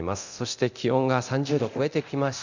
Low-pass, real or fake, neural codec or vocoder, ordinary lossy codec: 7.2 kHz; fake; codec, 24 kHz, 0.9 kbps, DualCodec; none